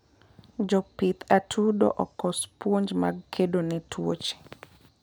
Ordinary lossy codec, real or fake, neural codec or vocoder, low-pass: none; real; none; none